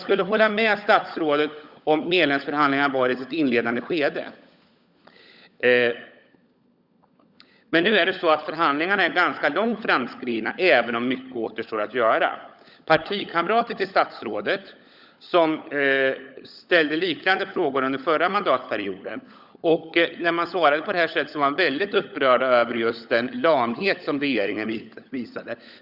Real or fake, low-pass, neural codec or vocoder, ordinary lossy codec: fake; 5.4 kHz; codec, 16 kHz, 16 kbps, FunCodec, trained on LibriTTS, 50 frames a second; Opus, 64 kbps